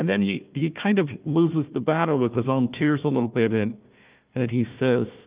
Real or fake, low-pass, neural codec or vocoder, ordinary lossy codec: fake; 3.6 kHz; codec, 16 kHz, 1 kbps, FunCodec, trained on Chinese and English, 50 frames a second; Opus, 32 kbps